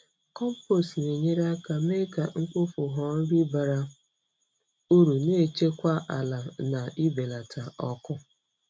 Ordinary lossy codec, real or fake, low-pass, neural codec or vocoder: none; real; none; none